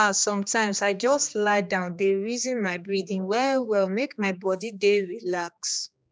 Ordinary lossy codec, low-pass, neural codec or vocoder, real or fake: none; none; codec, 16 kHz, 2 kbps, X-Codec, HuBERT features, trained on general audio; fake